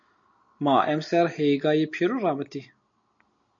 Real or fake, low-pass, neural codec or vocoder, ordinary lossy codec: real; 7.2 kHz; none; MP3, 48 kbps